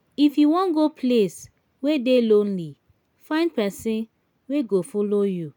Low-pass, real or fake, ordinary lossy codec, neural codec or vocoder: 19.8 kHz; real; none; none